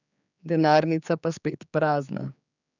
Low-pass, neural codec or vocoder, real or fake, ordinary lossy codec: 7.2 kHz; codec, 16 kHz, 4 kbps, X-Codec, HuBERT features, trained on general audio; fake; none